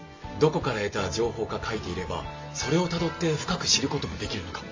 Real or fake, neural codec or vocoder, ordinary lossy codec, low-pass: real; none; MP3, 32 kbps; 7.2 kHz